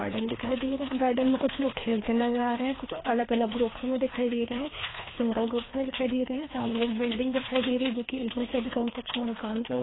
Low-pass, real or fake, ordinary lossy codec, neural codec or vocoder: 7.2 kHz; fake; AAC, 16 kbps; codec, 16 kHz, 2 kbps, FreqCodec, larger model